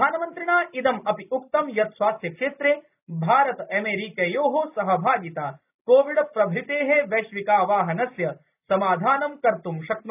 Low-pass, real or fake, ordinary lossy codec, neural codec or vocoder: 3.6 kHz; real; none; none